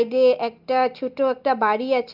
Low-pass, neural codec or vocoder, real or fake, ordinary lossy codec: 5.4 kHz; none; real; Opus, 24 kbps